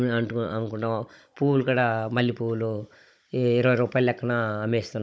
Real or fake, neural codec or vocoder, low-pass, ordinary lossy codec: fake; codec, 16 kHz, 16 kbps, FunCodec, trained on Chinese and English, 50 frames a second; none; none